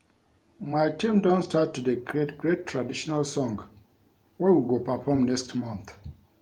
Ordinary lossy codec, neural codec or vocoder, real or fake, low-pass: Opus, 32 kbps; vocoder, 48 kHz, 128 mel bands, Vocos; fake; 19.8 kHz